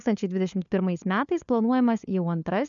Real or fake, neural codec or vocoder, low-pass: fake; codec, 16 kHz, 4 kbps, FunCodec, trained on LibriTTS, 50 frames a second; 7.2 kHz